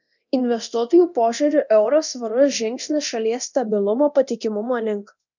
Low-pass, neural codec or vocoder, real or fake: 7.2 kHz; codec, 24 kHz, 0.9 kbps, DualCodec; fake